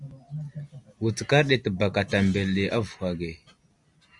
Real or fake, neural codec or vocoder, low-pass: real; none; 10.8 kHz